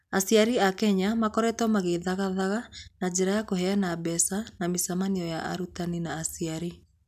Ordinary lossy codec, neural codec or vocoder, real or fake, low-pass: none; none; real; 14.4 kHz